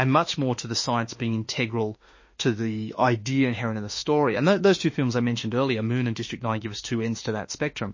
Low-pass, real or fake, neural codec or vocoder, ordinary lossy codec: 7.2 kHz; fake; autoencoder, 48 kHz, 32 numbers a frame, DAC-VAE, trained on Japanese speech; MP3, 32 kbps